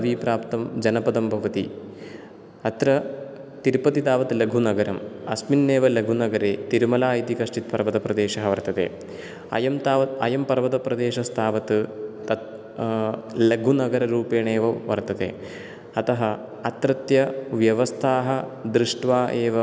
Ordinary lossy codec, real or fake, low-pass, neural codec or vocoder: none; real; none; none